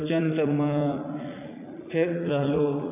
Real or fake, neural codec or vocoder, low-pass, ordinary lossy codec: fake; vocoder, 44.1 kHz, 80 mel bands, Vocos; 3.6 kHz; none